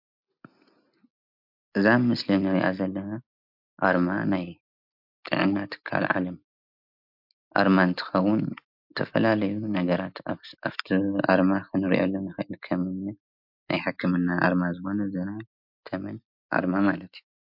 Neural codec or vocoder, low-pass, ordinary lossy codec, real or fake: none; 5.4 kHz; MP3, 48 kbps; real